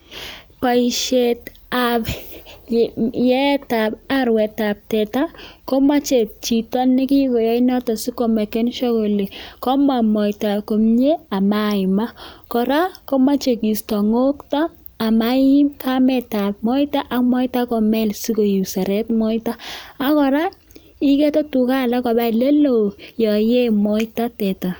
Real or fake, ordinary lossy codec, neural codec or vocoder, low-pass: real; none; none; none